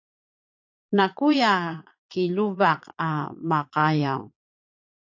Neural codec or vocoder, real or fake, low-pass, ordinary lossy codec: vocoder, 22.05 kHz, 80 mel bands, Vocos; fake; 7.2 kHz; AAC, 48 kbps